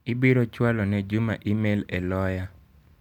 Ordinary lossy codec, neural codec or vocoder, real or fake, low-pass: none; none; real; 19.8 kHz